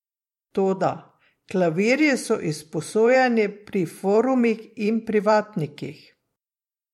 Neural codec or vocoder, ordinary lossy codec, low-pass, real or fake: vocoder, 44.1 kHz, 128 mel bands every 512 samples, BigVGAN v2; MP3, 64 kbps; 19.8 kHz; fake